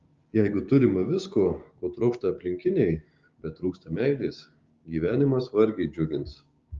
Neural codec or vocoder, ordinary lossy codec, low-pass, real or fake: codec, 16 kHz, 6 kbps, DAC; Opus, 32 kbps; 7.2 kHz; fake